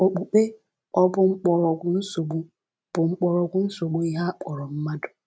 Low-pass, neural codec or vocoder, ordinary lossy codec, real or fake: none; none; none; real